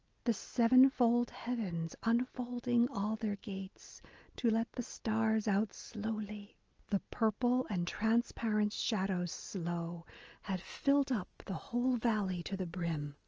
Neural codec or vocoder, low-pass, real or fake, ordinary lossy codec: none; 7.2 kHz; real; Opus, 24 kbps